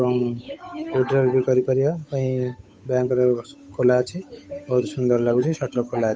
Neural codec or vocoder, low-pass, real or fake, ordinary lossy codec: codec, 16 kHz, 8 kbps, FunCodec, trained on Chinese and English, 25 frames a second; none; fake; none